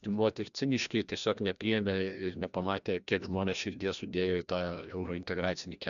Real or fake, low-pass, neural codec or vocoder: fake; 7.2 kHz; codec, 16 kHz, 1 kbps, FreqCodec, larger model